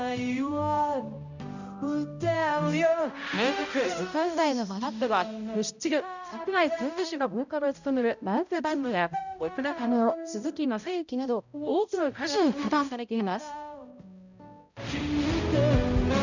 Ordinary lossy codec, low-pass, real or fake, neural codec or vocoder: none; 7.2 kHz; fake; codec, 16 kHz, 0.5 kbps, X-Codec, HuBERT features, trained on balanced general audio